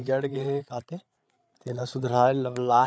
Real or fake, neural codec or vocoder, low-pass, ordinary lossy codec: fake; codec, 16 kHz, 8 kbps, FreqCodec, larger model; none; none